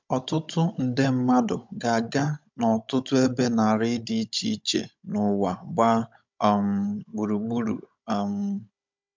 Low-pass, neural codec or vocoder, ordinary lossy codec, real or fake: 7.2 kHz; codec, 16 kHz, 16 kbps, FunCodec, trained on Chinese and English, 50 frames a second; MP3, 64 kbps; fake